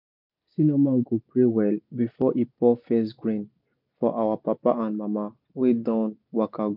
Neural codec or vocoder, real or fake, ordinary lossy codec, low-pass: none; real; MP3, 48 kbps; 5.4 kHz